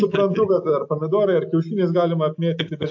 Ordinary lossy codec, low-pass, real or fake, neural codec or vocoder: AAC, 48 kbps; 7.2 kHz; real; none